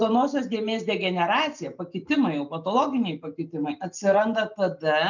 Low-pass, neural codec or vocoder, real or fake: 7.2 kHz; none; real